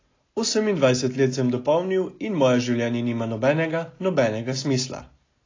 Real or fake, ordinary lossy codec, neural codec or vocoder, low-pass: real; AAC, 32 kbps; none; 7.2 kHz